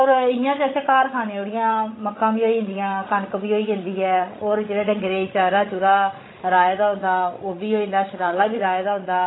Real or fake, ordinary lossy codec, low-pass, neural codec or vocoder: fake; AAC, 16 kbps; 7.2 kHz; codec, 16 kHz, 16 kbps, FreqCodec, larger model